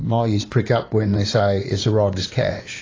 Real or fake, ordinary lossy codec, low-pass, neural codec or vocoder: fake; AAC, 32 kbps; 7.2 kHz; codec, 16 kHz in and 24 kHz out, 2.2 kbps, FireRedTTS-2 codec